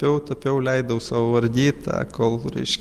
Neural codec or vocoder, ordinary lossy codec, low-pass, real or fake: none; Opus, 32 kbps; 14.4 kHz; real